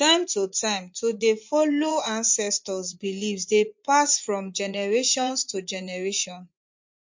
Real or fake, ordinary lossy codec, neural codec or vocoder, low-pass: fake; MP3, 48 kbps; vocoder, 24 kHz, 100 mel bands, Vocos; 7.2 kHz